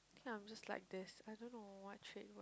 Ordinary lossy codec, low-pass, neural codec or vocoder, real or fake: none; none; none; real